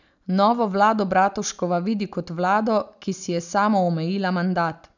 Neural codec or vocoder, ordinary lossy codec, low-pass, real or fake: none; none; 7.2 kHz; real